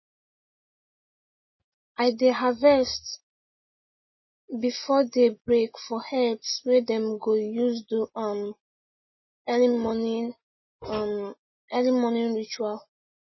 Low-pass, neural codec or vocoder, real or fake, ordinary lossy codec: 7.2 kHz; none; real; MP3, 24 kbps